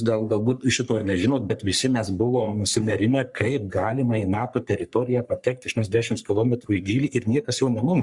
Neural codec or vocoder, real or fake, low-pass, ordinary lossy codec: codec, 44.1 kHz, 3.4 kbps, Pupu-Codec; fake; 10.8 kHz; Opus, 64 kbps